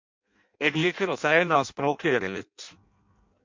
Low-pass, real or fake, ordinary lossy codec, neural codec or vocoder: 7.2 kHz; fake; MP3, 48 kbps; codec, 16 kHz in and 24 kHz out, 0.6 kbps, FireRedTTS-2 codec